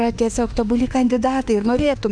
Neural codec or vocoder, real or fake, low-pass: autoencoder, 48 kHz, 32 numbers a frame, DAC-VAE, trained on Japanese speech; fake; 9.9 kHz